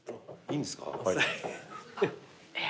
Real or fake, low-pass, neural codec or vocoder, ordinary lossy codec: real; none; none; none